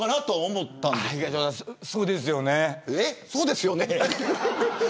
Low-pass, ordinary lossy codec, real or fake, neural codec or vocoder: none; none; real; none